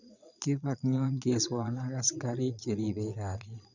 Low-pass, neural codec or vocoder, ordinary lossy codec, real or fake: 7.2 kHz; vocoder, 22.05 kHz, 80 mel bands, Vocos; none; fake